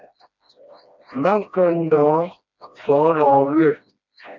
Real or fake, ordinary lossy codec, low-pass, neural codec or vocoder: fake; MP3, 64 kbps; 7.2 kHz; codec, 16 kHz, 1 kbps, FreqCodec, smaller model